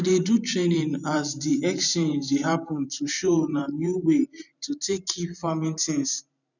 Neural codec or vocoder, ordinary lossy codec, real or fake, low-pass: vocoder, 44.1 kHz, 128 mel bands every 512 samples, BigVGAN v2; none; fake; 7.2 kHz